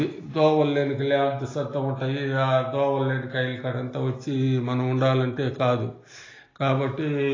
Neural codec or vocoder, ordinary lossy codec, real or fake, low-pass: none; AAC, 32 kbps; real; 7.2 kHz